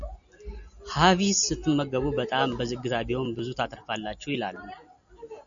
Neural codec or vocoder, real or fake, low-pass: none; real; 7.2 kHz